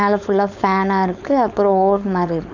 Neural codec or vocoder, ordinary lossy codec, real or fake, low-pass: codec, 16 kHz, 4.8 kbps, FACodec; none; fake; 7.2 kHz